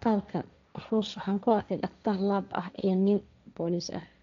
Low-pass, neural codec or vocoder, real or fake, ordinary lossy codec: 7.2 kHz; codec, 16 kHz, 1.1 kbps, Voila-Tokenizer; fake; MP3, 64 kbps